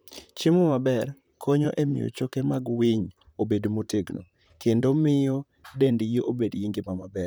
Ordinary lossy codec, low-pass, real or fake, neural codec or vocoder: none; none; fake; vocoder, 44.1 kHz, 128 mel bands, Pupu-Vocoder